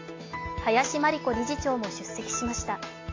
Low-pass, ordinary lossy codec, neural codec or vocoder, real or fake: 7.2 kHz; AAC, 32 kbps; none; real